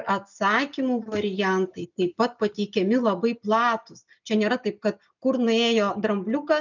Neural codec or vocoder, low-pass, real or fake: none; 7.2 kHz; real